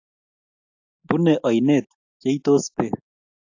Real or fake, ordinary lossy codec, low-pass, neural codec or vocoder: real; AAC, 48 kbps; 7.2 kHz; none